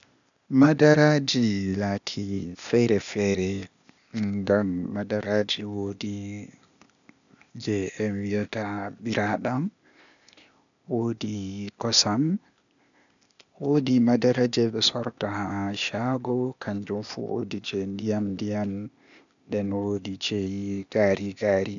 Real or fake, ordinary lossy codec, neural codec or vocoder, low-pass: fake; none; codec, 16 kHz, 0.8 kbps, ZipCodec; 7.2 kHz